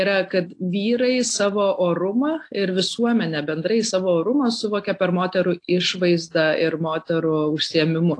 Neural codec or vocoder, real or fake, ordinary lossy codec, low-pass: none; real; AAC, 48 kbps; 9.9 kHz